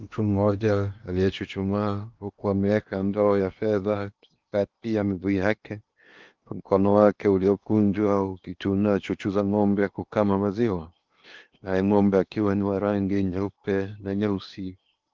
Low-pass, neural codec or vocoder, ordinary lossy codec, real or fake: 7.2 kHz; codec, 16 kHz in and 24 kHz out, 0.8 kbps, FocalCodec, streaming, 65536 codes; Opus, 32 kbps; fake